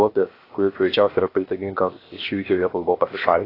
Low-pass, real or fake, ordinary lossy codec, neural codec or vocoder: 5.4 kHz; fake; AAC, 24 kbps; codec, 16 kHz, 0.7 kbps, FocalCodec